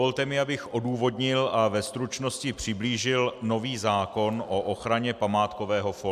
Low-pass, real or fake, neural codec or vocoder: 14.4 kHz; real; none